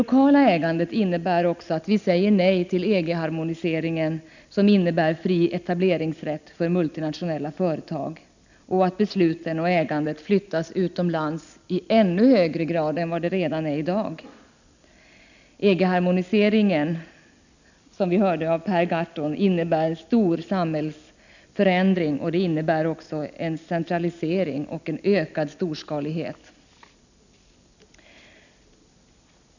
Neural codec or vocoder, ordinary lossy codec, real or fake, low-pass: none; none; real; 7.2 kHz